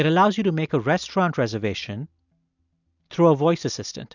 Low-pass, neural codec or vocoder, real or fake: 7.2 kHz; none; real